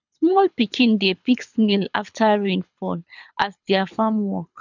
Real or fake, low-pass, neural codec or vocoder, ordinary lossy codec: fake; 7.2 kHz; codec, 24 kHz, 6 kbps, HILCodec; none